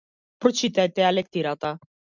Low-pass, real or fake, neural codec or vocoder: 7.2 kHz; real; none